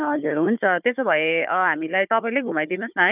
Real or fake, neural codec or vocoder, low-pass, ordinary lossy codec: fake; codec, 16 kHz, 4 kbps, FunCodec, trained on Chinese and English, 50 frames a second; 3.6 kHz; none